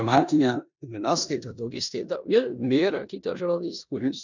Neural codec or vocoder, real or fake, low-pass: codec, 16 kHz in and 24 kHz out, 0.9 kbps, LongCat-Audio-Codec, four codebook decoder; fake; 7.2 kHz